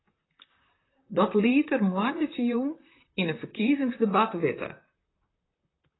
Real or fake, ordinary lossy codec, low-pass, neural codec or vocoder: fake; AAC, 16 kbps; 7.2 kHz; vocoder, 44.1 kHz, 128 mel bands, Pupu-Vocoder